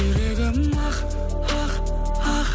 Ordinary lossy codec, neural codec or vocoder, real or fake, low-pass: none; none; real; none